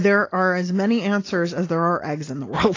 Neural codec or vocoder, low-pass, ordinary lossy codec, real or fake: none; 7.2 kHz; AAC, 32 kbps; real